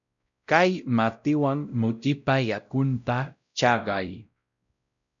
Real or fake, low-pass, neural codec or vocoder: fake; 7.2 kHz; codec, 16 kHz, 0.5 kbps, X-Codec, WavLM features, trained on Multilingual LibriSpeech